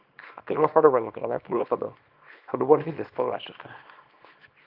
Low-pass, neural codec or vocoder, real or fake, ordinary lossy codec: 5.4 kHz; codec, 24 kHz, 0.9 kbps, WavTokenizer, small release; fake; Opus, 24 kbps